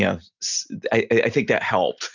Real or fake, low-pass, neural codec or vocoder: real; 7.2 kHz; none